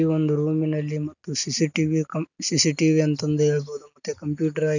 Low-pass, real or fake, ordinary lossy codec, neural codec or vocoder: 7.2 kHz; real; none; none